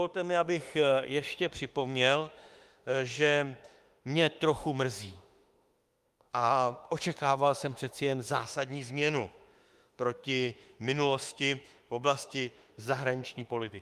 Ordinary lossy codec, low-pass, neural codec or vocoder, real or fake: Opus, 32 kbps; 14.4 kHz; autoencoder, 48 kHz, 32 numbers a frame, DAC-VAE, trained on Japanese speech; fake